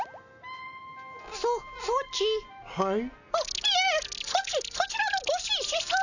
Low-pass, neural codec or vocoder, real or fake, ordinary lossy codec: 7.2 kHz; none; real; none